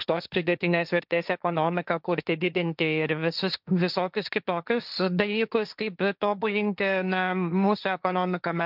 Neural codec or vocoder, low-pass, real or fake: codec, 16 kHz, 1.1 kbps, Voila-Tokenizer; 5.4 kHz; fake